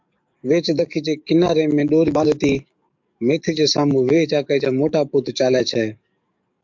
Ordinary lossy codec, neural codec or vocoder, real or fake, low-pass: MP3, 64 kbps; codec, 44.1 kHz, 7.8 kbps, DAC; fake; 7.2 kHz